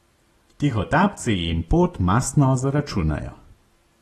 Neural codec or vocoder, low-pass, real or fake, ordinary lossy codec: none; 19.8 kHz; real; AAC, 32 kbps